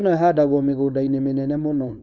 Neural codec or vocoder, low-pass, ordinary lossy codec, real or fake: codec, 16 kHz, 4.8 kbps, FACodec; none; none; fake